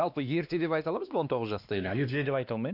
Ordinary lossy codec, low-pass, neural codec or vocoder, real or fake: none; 5.4 kHz; codec, 16 kHz, 2 kbps, X-Codec, WavLM features, trained on Multilingual LibriSpeech; fake